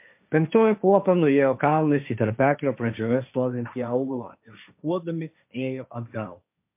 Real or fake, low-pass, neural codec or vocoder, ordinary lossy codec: fake; 3.6 kHz; codec, 16 kHz, 1.1 kbps, Voila-Tokenizer; MP3, 32 kbps